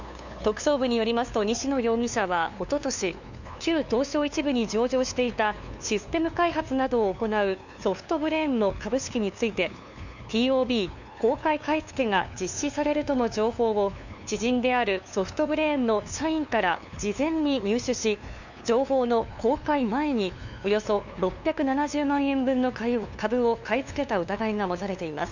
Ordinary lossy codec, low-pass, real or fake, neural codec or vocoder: none; 7.2 kHz; fake; codec, 16 kHz, 2 kbps, FunCodec, trained on LibriTTS, 25 frames a second